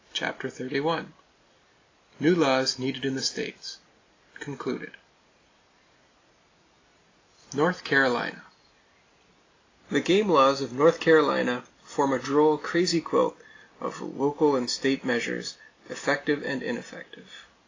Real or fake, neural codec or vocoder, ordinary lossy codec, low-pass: real; none; AAC, 32 kbps; 7.2 kHz